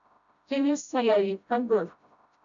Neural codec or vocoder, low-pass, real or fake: codec, 16 kHz, 0.5 kbps, FreqCodec, smaller model; 7.2 kHz; fake